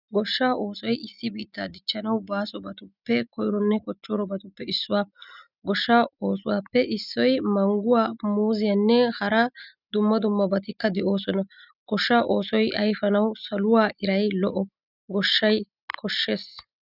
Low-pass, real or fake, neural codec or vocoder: 5.4 kHz; real; none